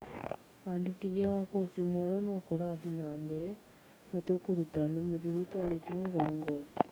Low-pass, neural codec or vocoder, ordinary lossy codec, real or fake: none; codec, 44.1 kHz, 2.6 kbps, DAC; none; fake